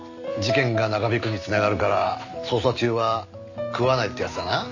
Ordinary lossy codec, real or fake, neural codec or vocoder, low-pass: none; real; none; 7.2 kHz